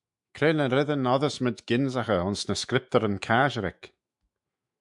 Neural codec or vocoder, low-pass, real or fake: autoencoder, 48 kHz, 128 numbers a frame, DAC-VAE, trained on Japanese speech; 10.8 kHz; fake